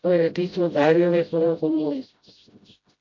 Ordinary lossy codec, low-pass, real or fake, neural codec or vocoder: MP3, 48 kbps; 7.2 kHz; fake; codec, 16 kHz, 0.5 kbps, FreqCodec, smaller model